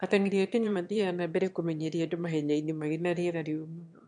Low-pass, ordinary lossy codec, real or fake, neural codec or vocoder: 9.9 kHz; MP3, 64 kbps; fake; autoencoder, 22.05 kHz, a latent of 192 numbers a frame, VITS, trained on one speaker